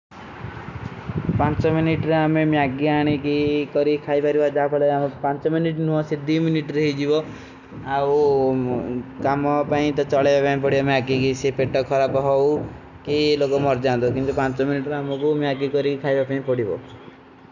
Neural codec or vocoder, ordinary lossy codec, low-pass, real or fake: none; none; 7.2 kHz; real